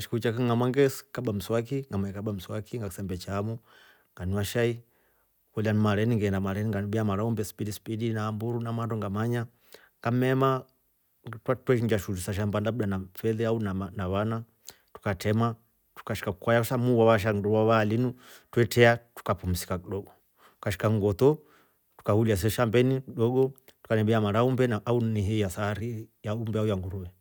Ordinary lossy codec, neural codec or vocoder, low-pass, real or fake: none; none; none; real